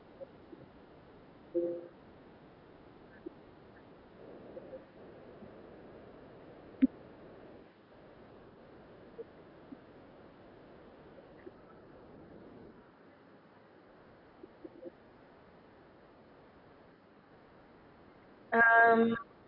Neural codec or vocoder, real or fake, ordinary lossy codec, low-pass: none; real; none; 5.4 kHz